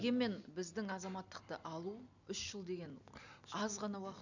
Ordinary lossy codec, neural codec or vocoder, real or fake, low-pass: none; none; real; 7.2 kHz